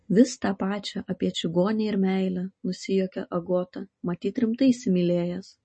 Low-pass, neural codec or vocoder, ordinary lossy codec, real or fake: 9.9 kHz; none; MP3, 32 kbps; real